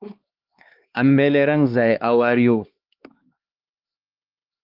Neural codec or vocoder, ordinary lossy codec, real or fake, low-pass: codec, 16 kHz, 2 kbps, X-Codec, HuBERT features, trained on LibriSpeech; Opus, 32 kbps; fake; 5.4 kHz